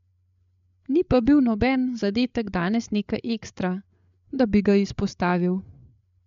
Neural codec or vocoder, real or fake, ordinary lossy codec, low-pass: codec, 16 kHz, 8 kbps, FreqCodec, larger model; fake; MP3, 64 kbps; 7.2 kHz